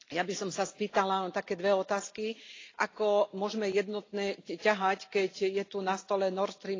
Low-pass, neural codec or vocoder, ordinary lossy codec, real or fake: 7.2 kHz; none; AAC, 32 kbps; real